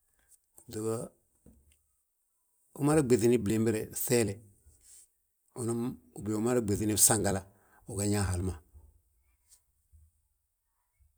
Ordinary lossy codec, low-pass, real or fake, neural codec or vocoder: none; none; fake; vocoder, 44.1 kHz, 128 mel bands every 256 samples, BigVGAN v2